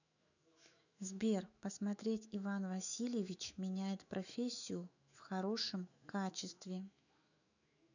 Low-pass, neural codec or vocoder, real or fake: 7.2 kHz; autoencoder, 48 kHz, 128 numbers a frame, DAC-VAE, trained on Japanese speech; fake